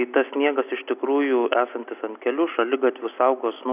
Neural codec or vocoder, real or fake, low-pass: none; real; 3.6 kHz